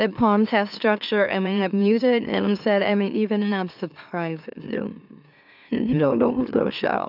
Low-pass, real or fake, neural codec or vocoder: 5.4 kHz; fake; autoencoder, 44.1 kHz, a latent of 192 numbers a frame, MeloTTS